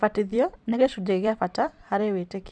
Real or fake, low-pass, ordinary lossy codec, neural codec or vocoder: fake; 9.9 kHz; none; vocoder, 44.1 kHz, 128 mel bands every 256 samples, BigVGAN v2